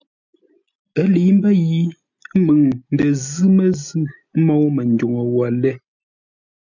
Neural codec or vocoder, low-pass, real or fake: none; 7.2 kHz; real